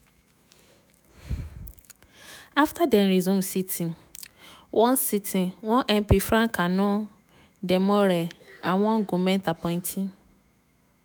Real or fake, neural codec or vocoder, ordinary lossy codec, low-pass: fake; autoencoder, 48 kHz, 128 numbers a frame, DAC-VAE, trained on Japanese speech; none; none